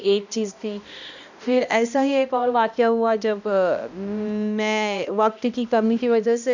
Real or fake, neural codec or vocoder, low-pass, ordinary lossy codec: fake; codec, 16 kHz, 1 kbps, X-Codec, HuBERT features, trained on balanced general audio; 7.2 kHz; none